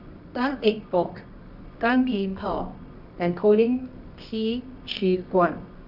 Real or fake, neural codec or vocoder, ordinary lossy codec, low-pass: fake; codec, 24 kHz, 0.9 kbps, WavTokenizer, medium music audio release; none; 5.4 kHz